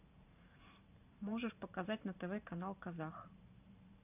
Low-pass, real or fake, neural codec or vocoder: 3.6 kHz; fake; vocoder, 44.1 kHz, 128 mel bands every 512 samples, BigVGAN v2